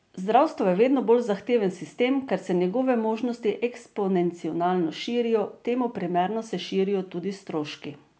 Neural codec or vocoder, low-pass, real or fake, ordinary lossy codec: none; none; real; none